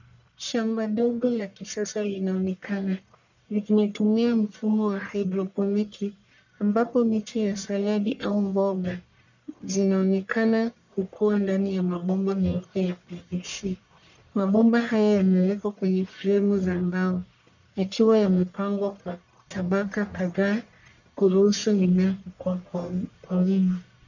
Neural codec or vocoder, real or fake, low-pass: codec, 44.1 kHz, 1.7 kbps, Pupu-Codec; fake; 7.2 kHz